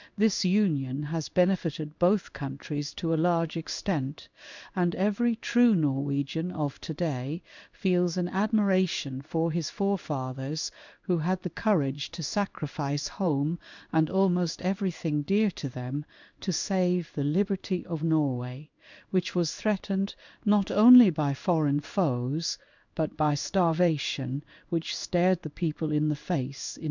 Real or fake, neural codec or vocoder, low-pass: fake; codec, 16 kHz in and 24 kHz out, 1 kbps, XY-Tokenizer; 7.2 kHz